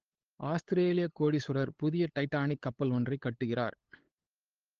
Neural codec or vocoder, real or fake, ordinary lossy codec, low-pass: codec, 16 kHz, 8 kbps, FunCodec, trained on LibriTTS, 25 frames a second; fake; Opus, 16 kbps; 7.2 kHz